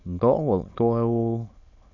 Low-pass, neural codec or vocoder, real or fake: 7.2 kHz; autoencoder, 22.05 kHz, a latent of 192 numbers a frame, VITS, trained on many speakers; fake